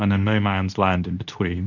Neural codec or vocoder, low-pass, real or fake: codec, 24 kHz, 0.9 kbps, WavTokenizer, medium speech release version 2; 7.2 kHz; fake